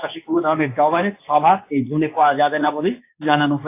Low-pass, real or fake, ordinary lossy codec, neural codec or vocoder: 3.6 kHz; fake; AAC, 24 kbps; codec, 24 kHz, 0.9 kbps, WavTokenizer, medium speech release version 2